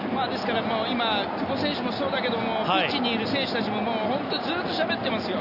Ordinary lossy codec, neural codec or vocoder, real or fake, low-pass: none; none; real; 5.4 kHz